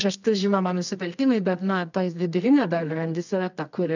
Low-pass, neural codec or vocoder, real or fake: 7.2 kHz; codec, 24 kHz, 0.9 kbps, WavTokenizer, medium music audio release; fake